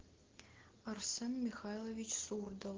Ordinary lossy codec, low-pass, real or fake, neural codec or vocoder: Opus, 16 kbps; 7.2 kHz; real; none